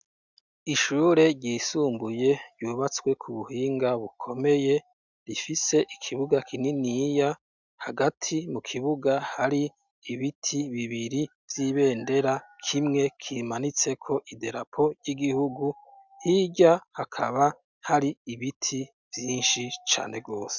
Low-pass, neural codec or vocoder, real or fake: 7.2 kHz; none; real